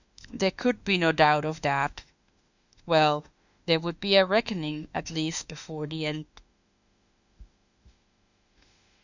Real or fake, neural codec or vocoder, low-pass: fake; autoencoder, 48 kHz, 32 numbers a frame, DAC-VAE, trained on Japanese speech; 7.2 kHz